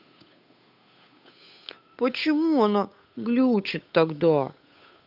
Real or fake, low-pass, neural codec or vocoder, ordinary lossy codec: fake; 5.4 kHz; codec, 16 kHz, 8 kbps, FunCodec, trained on Chinese and English, 25 frames a second; none